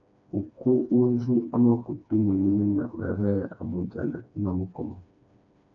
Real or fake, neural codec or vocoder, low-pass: fake; codec, 16 kHz, 2 kbps, FreqCodec, smaller model; 7.2 kHz